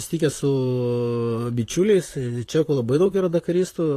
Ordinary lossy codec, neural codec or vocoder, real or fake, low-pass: AAC, 48 kbps; vocoder, 44.1 kHz, 128 mel bands, Pupu-Vocoder; fake; 14.4 kHz